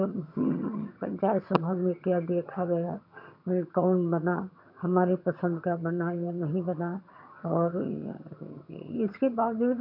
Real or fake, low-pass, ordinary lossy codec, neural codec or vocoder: fake; 5.4 kHz; none; vocoder, 22.05 kHz, 80 mel bands, HiFi-GAN